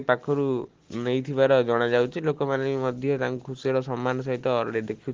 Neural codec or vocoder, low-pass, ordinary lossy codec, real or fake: none; 7.2 kHz; Opus, 16 kbps; real